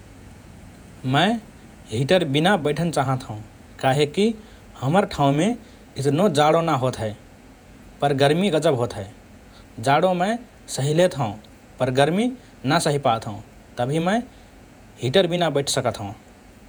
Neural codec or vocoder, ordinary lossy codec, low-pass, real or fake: vocoder, 48 kHz, 128 mel bands, Vocos; none; none; fake